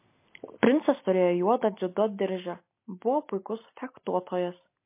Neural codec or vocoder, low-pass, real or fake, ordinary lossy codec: none; 3.6 kHz; real; MP3, 24 kbps